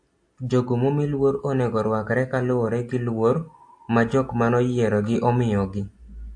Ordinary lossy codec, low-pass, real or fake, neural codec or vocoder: AAC, 64 kbps; 9.9 kHz; real; none